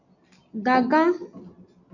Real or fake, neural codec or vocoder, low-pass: real; none; 7.2 kHz